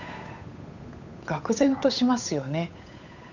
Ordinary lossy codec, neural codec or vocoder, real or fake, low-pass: none; codec, 16 kHz, 8 kbps, FunCodec, trained on Chinese and English, 25 frames a second; fake; 7.2 kHz